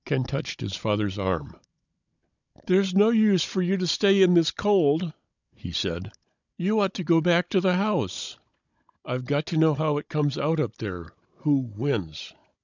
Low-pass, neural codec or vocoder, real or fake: 7.2 kHz; codec, 16 kHz, 16 kbps, FunCodec, trained on Chinese and English, 50 frames a second; fake